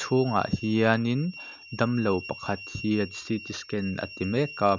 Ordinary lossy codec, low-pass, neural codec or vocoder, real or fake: none; 7.2 kHz; none; real